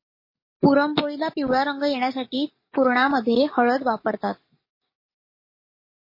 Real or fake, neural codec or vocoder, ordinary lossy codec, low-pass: fake; codec, 44.1 kHz, 7.8 kbps, DAC; MP3, 24 kbps; 5.4 kHz